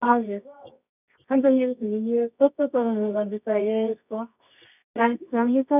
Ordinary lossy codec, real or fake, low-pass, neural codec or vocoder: none; fake; 3.6 kHz; codec, 24 kHz, 0.9 kbps, WavTokenizer, medium music audio release